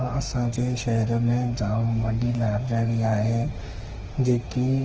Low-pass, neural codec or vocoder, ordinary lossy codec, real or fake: 7.2 kHz; autoencoder, 48 kHz, 32 numbers a frame, DAC-VAE, trained on Japanese speech; Opus, 16 kbps; fake